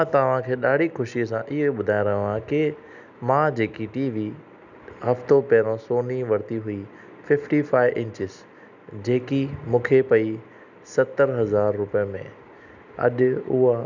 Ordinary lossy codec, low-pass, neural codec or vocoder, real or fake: none; 7.2 kHz; none; real